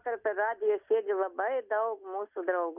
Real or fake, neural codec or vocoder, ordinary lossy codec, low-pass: real; none; Opus, 64 kbps; 3.6 kHz